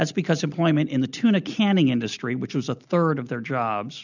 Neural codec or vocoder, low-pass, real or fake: none; 7.2 kHz; real